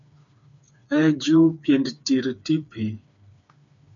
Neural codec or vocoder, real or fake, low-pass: codec, 16 kHz, 4 kbps, FreqCodec, smaller model; fake; 7.2 kHz